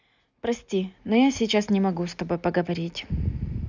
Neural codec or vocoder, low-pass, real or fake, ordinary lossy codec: none; 7.2 kHz; real; none